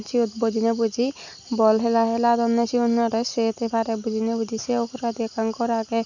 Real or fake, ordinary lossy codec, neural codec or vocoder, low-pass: real; none; none; 7.2 kHz